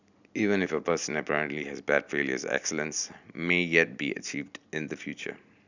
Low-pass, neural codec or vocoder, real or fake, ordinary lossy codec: 7.2 kHz; none; real; none